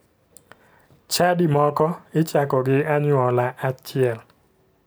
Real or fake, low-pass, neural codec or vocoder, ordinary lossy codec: real; none; none; none